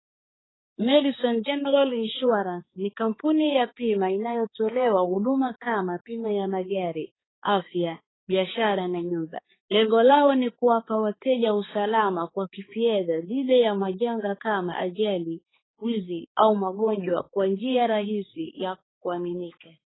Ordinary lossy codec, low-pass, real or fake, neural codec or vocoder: AAC, 16 kbps; 7.2 kHz; fake; codec, 16 kHz, 4 kbps, X-Codec, HuBERT features, trained on balanced general audio